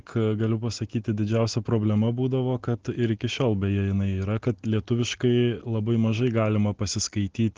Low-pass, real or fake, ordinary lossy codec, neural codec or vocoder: 7.2 kHz; real; Opus, 16 kbps; none